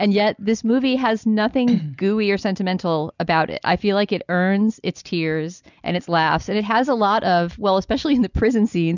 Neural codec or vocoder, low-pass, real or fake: none; 7.2 kHz; real